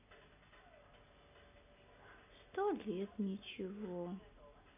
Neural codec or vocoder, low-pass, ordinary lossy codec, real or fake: none; 3.6 kHz; none; real